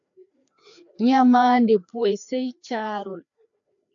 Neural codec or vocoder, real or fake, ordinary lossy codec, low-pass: codec, 16 kHz, 2 kbps, FreqCodec, larger model; fake; AAC, 64 kbps; 7.2 kHz